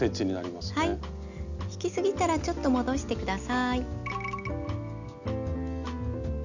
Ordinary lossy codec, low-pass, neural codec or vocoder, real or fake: none; 7.2 kHz; none; real